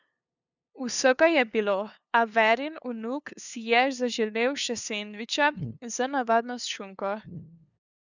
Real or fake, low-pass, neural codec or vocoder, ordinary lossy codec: fake; 7.2 kHz; codec, 16 kHz, 2 kbps, FunCodec, trained on LibriTTS, 25 frames a second; none